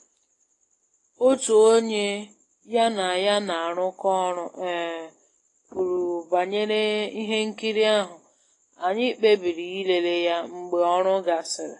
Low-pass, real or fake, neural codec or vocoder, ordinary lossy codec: 10.8 kHz; real; none; AAC, 32 kbps